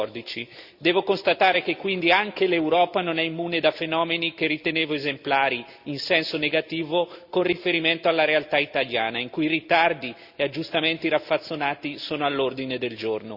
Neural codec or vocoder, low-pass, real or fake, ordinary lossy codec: none; 5.4 kHz; real; Opus, 64 kbps